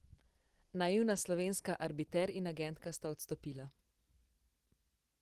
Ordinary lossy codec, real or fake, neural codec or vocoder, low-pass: Opus, 16 kbps; real; none; 14.4 kHz